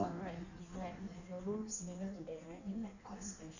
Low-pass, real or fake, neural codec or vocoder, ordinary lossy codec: 7.2 kHz; fake; codec, 16 kHz in and 24 kHz out, 1.1 kbps, FireRedTTS-2 codec; none